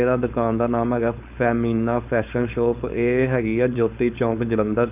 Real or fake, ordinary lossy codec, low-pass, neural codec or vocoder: fake; none; 3.6 kHz; codec, 16 kHz, 4.8 kbps, FACodec